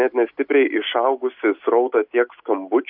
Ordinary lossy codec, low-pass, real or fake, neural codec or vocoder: AAC, 48 kbps; 5.4 kHz; real; none